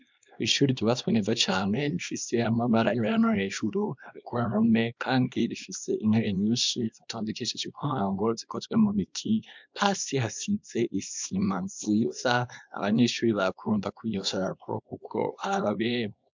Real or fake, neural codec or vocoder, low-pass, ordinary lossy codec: fake; codec, 24 kHz, 0.9 kbps, WavTokenizer, small release; 7.2 kHz; MP3, 64 kbps